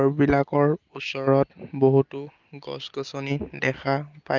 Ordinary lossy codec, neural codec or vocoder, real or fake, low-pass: Opus, 24 kbps; none; real; 7.2 kHz